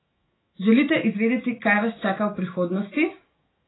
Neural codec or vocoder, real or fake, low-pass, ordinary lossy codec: autoencoder, 48 kHz, 128 numbers a frame, DAC-VAE, trained on Japanese speech; fake; 7.2 kHz; AAC, 16 kbps